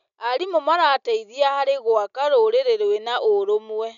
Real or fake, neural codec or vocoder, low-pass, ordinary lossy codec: real; none; 7.2 kHz; none